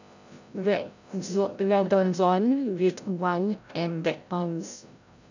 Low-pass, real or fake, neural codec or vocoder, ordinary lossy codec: 7.2 kHz; fake; codec, 16 kHz, 0.5 kbps, FreqCodec, larger model; none